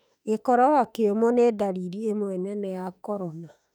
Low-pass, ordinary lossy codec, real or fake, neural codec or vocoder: 19.8 kHz; none; fake; autoencoder, 48 kHz, 32 numbers a frame, DAC-VAE, trained on Japanese speech